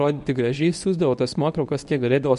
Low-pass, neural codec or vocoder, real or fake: 10.8 kHz; codec, 24 kHz, 0.9 kbps, WavTokenizer, medium speech release version 1; fake